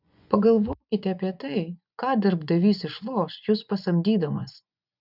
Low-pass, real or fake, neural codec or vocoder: 5.4 kHz; fake; vocoder, 24 kHz, 100 mel bands, Vocos